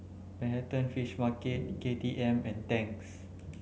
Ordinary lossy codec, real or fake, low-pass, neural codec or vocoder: none; real; none; none